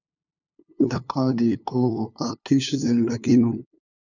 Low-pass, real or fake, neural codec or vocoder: 7.2 kHz; fake; codec, 16 kHz, 2 kbps, FunCodec, trained on LibriTTS, 25 frames a second